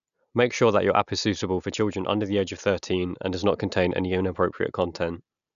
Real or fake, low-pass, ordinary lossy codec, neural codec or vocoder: real; 7.2 kHz; none; none